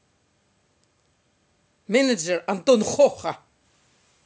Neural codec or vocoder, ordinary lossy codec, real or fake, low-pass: none; none; real; none